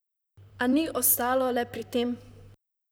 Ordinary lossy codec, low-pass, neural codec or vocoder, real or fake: none; none; vocoder, 44.1 kHz, 128 mel bands, Pupu-Vocoder; fake